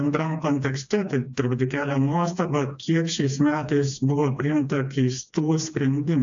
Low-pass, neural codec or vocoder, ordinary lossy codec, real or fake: 7.2 kHz; codec, 16 kHz, 2 kbps, FreqCodec, smaller model; AAC, 64 kbps; fake